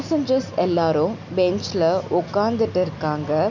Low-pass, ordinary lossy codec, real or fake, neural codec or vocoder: 7.2 kHz; none; real; none